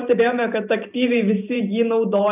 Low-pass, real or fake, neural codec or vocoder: 3.6 kHz; fake; vocoder, 44.1 kHz, 128 mel bands every 512 samples, BigVGAN v2